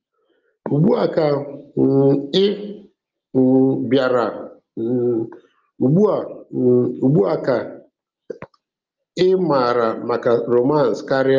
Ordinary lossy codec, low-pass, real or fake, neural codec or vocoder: Opus, 24 kbps; 7.2 kHz; real; none